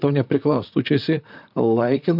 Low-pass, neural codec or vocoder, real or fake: 5.4 kHz; vocoder, 44.1 kHz, 128 mel bands, Pupu-Vocoder; fake